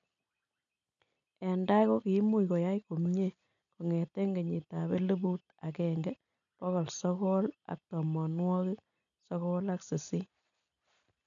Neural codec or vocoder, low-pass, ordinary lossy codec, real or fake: none; 7.2 kHz; none; real